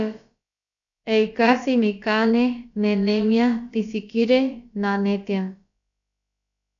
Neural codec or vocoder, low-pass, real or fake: codec, 16 kHz, about 1 kbps, DyCAST, with the encoder's durations; 7.2 kHz; fake